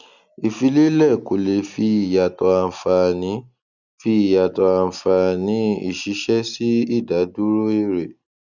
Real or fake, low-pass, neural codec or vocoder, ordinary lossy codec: real; 7.2 kHz; none; none